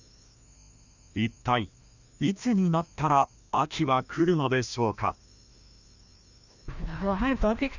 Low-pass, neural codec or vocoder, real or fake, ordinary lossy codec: 7.2 kHz; codec, 16 kHz, 1 kbps, FreqCodec, larger model; fake; none